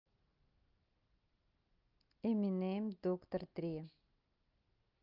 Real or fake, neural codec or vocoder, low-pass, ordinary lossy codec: real; none; 5.4 kHz; Opus, 24 kbps